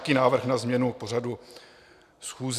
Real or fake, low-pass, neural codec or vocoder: real; 14.4 kHz; none